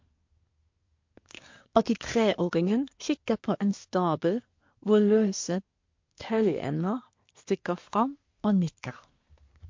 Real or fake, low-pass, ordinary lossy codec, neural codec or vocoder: fake; 7.2 kHz; MP3, 48 kbps; codec, 24 kHz, 1 kbps, SNAC